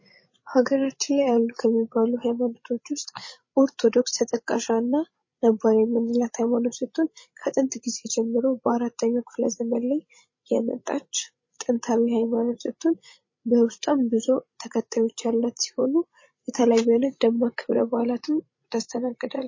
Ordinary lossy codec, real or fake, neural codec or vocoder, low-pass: MP3, 32 kbps; fake; codec, 16 kHz, 16 kbps, FreqCodec, larger model; 7.2 kHz